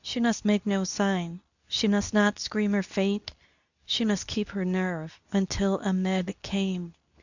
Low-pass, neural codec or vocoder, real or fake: 7.2 kHz; codec, 24 kHz, 0.9 kbps, WavTokenizer, medium speech release version 2; fake